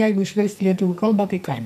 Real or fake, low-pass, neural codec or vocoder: fake; 14.4 kHz; codec, 44.1 kHz, 2.6 kbps, SNAC